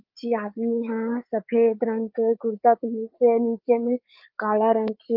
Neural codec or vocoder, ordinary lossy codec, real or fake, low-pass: codec, 16 kHz, 8 kbps, FreqCodec, larger model; Opus, 24 kbps; fake; 5.4 kHz